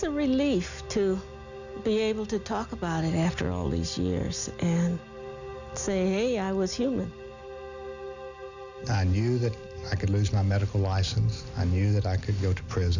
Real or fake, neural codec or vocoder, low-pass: real; none; 7.2 kHz